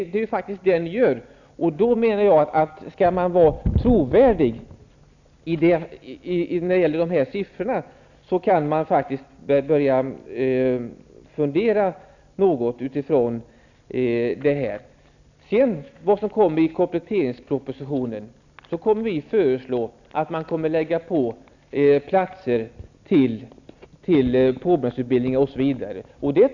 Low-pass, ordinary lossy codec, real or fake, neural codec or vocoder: 7.2 kHz; none; real; none